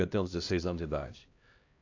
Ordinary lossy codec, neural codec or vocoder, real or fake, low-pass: AAC, 48 kbps; codec, 16 kHz, 1 kbps, X-Codec, HuBERT features, trained on LibriSpeech; fake; 7.2 kHz